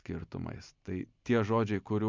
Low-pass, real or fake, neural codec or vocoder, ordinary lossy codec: 7.2 kHz; real; none; MP3, 64 kbps